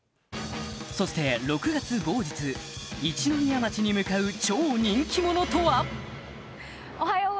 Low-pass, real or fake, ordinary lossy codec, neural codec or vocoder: none; real; none; none